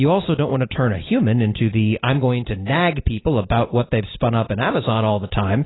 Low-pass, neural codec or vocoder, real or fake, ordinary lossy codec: 7.2 kHz; none; real; AAC, 16 kbps